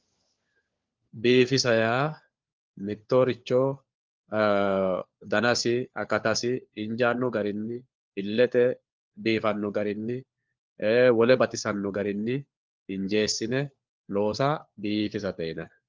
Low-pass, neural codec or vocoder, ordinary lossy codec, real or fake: 7.2 kHz; codec, 16 kHz, 4 kbps, FunCodec, trained on LibriTTS, 50 frames a second; Opus, 32 kbps; fake